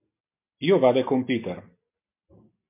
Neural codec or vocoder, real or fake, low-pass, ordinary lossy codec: none; real; 3.6 kHz; MP3, 24 kbps